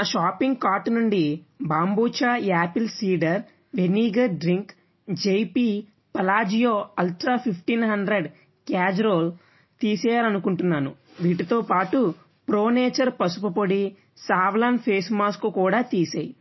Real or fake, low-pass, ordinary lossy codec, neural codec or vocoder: real; 7.2 kHz; MP3, 24 kbps; none